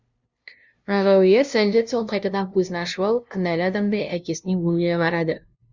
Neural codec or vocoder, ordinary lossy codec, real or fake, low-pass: codec, 16 kHz, 0.5 kbps, FunCodec, trained on LibriTTS, 25 frames a second; Opus, 64 kbps; fake; 7.2 kHz